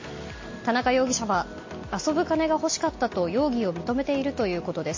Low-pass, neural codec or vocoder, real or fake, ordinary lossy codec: 7.2 kHz; none; real; MP3, 32 kbps